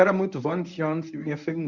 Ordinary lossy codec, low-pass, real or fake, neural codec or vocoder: none; 7.2 kHz; fake; codec, 24 kHz, 0.9 kbps, WavTokenizer, medium speech release version 1